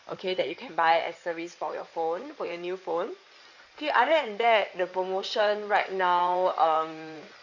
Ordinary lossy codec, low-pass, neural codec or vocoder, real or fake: none; 7.2 kHz; codec, 16 kHz in and 24 kHz out, 2.2 kbps, FireRedTTS-2 codec; fake